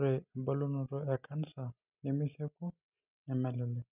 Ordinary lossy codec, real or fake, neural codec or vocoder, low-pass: none; real; none; 3.6 kHz